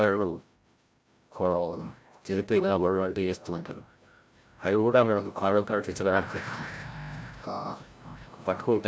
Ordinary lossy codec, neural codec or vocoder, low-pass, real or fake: none; codec, 16 kHz, 0.5 kbps, FreqCodec, larger model; none; fake